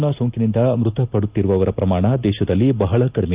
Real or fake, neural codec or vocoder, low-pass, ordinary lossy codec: real; none; 3.6 kHz; Opus, 32 kbps